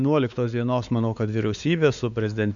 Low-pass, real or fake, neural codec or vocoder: 7.2 kHz; fake; codec, 16 kHz, 4 kbps, X-Codec, WavLM features, trained on Multilingual LibriSpeech